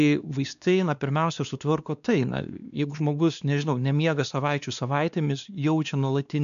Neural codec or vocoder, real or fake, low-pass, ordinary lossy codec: codec, 16 kHz, 2 kbps, X-Codec, WavLM features, trained on Multilingual LibriSpeech; fake; 7.2 kHz; MP3, 96 kbps